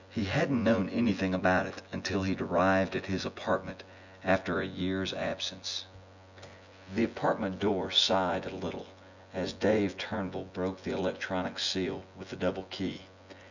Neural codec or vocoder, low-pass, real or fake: vocoder, 24 kHz, 100 mel bands, Vocos; 7.2 kHz; fake